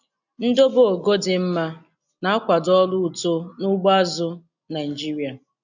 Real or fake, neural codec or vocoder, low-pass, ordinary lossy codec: real; none; 7.2 kHz; none